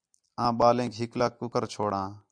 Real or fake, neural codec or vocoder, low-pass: real; none; 9.9 kHz